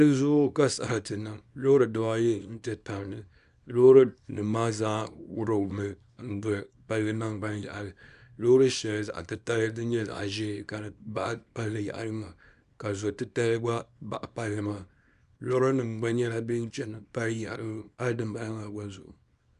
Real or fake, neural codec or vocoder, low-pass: fake; codec, 24 kHz, 0.9 kbps, WavTokenizer, small release; 10.8 kHz